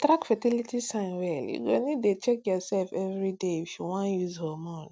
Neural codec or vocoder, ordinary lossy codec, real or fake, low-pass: none; none; real; none